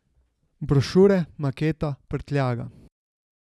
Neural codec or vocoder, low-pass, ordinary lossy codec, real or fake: none; none; none; real